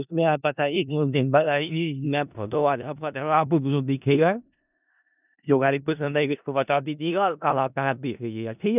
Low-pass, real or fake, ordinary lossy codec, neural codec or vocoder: 3.6 kHz; fake; none; codec, 16 kHz in and 24 kHz out, 0.4 kbps, LongCat-Audio-Codec, four codebook decoder